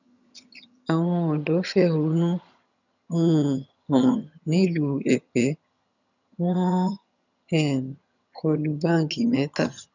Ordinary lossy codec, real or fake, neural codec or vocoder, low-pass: none; fake; vocoder, 22.05 kHz, 80 mel bands, HiFi-GAN; 7.2 kHz